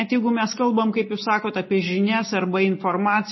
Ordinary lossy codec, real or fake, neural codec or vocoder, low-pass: MP3, 24 kbps; real; none; 7.2 kHz